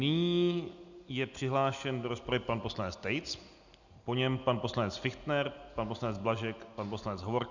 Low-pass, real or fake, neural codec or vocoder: 7.2 kHz; real; none